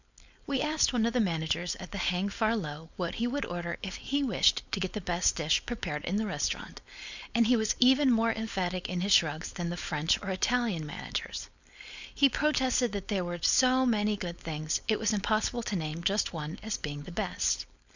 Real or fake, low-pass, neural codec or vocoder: fake; 7.2 kHz; codec, 16 kHz, 4.8 kbps, FACodec